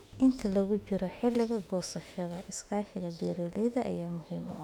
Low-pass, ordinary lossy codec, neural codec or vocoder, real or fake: 19.8 kHz; none; autoencoder, 48 kHz, 32 numbers a frame, DAC-VAE, trained on Japanese speech; fake